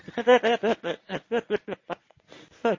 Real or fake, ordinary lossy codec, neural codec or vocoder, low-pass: fake; MP3, 32 kbps; codec, 24 kHz, 0.9 kbps, WavTokenizer, medium speech release version 1; 7.2 kHz